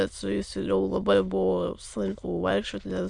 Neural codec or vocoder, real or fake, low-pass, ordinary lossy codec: autoencoder, 22.05 kHz, a latent of 192 numbers a frame, VITS, trained on many speakers; fake; 9.9 kHz; Opus, 64 kbps